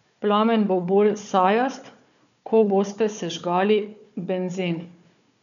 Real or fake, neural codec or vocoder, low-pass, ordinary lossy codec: fake; codec, 16 kHz, 4 kbps, FunCodec, trained on Chinese and English, 50 frames a second; 7.2 kHz; none